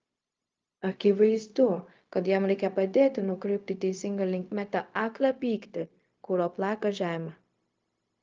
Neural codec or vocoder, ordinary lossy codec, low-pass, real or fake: codec, 16 kHz, 0.4 kbps, LongCat-Audio-Codec; Opus, 24 kbps; 7.2 kHz; fake